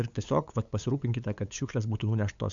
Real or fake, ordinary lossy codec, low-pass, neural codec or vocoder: fake; MP3, 64 kbps; 7.2 kHz; codec, 16 kHz, 8 kbps, FunCodec, trained on LibriTTS, 25 frames a second